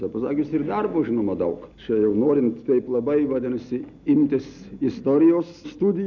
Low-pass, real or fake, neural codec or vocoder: 7.2 kHz; real; none